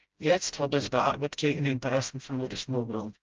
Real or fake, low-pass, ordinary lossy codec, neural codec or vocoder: fake; 7.2 kHz; Opus, 24 kbps; codec, 16 kHz, 0.5 kbps, FreqCodec, smaller model